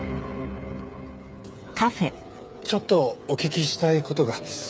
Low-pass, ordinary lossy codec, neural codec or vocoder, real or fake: none; none; codec, 16 kHz, 8 kbps, FreqCodec, smaller model; fake